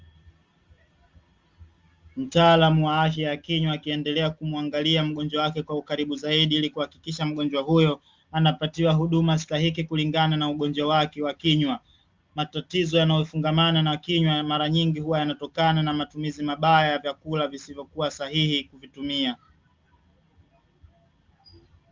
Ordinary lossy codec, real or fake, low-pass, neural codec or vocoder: Opus, 32 kbps; real; 7.2 kHz; none